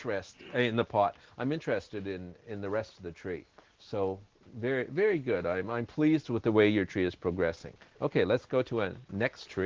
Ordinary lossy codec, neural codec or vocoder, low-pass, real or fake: Opus, 16 kbps; none; 7.2 kHz; real